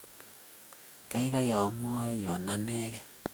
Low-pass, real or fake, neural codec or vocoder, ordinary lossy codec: none; fake; codec, 44.1 kHz, 2.6 kbps, DAC; none